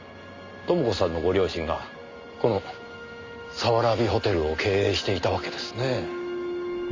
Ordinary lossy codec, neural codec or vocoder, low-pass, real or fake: Opus, 32 kbps; none; 7.2 kHz; real